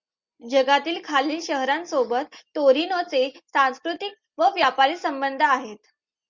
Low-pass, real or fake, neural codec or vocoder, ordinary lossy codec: 7.2 kHz; real; none; Opus, 64 kbps